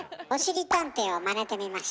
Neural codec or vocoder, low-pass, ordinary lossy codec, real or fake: none; none; none; real